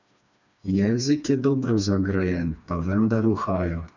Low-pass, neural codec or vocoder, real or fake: 7.2 kHz; codec, 16 kHz, 2 kbps, FreqCodec, smaller model; fake